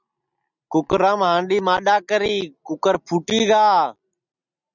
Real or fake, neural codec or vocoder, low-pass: real; none; 7.2 kHz